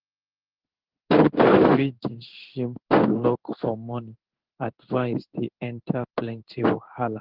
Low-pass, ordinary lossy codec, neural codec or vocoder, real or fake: 5.4 kHz; Opus, 16 kbps; codec, 16 kHz in and 24 kHz out, 1 kbps, XY-Tokenizer; fake